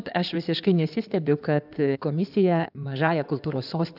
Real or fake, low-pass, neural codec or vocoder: fake; 5.4 kHz; codec, 16 kHz in and 24 kHz out, 2.2 kbps, FireRedTTS-2 codec